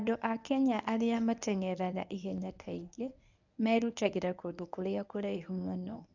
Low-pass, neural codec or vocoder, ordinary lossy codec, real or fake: 7.2 kHz; codec, 24 kHz, 0.9 kbps, WavTokenizer, medium speech release version 1; none; fake